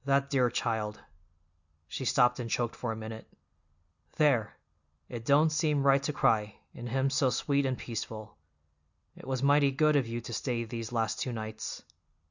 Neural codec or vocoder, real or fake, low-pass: none; real; 7.2 kHz